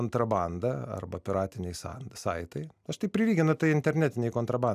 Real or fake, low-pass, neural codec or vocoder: real; 14.4 kHz; none